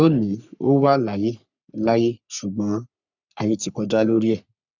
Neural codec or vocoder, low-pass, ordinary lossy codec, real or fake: codec, 44.1 kHz, 3.4 kbps, Pupu-Codec; 7.2 kHz; none; fake